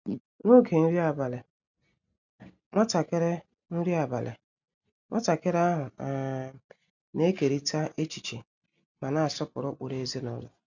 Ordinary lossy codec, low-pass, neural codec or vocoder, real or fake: none; 7.2 kHz; none; real